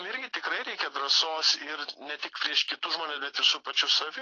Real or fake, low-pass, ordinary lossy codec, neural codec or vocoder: real; 7.2 kHz; AAC, 32 kbps; none